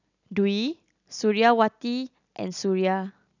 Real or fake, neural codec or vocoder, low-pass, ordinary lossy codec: real; none; 7.2 kHz; none